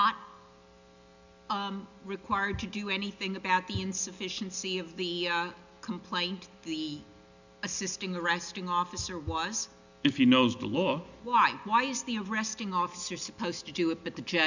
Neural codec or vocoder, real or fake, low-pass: none; real; 7.2 kHz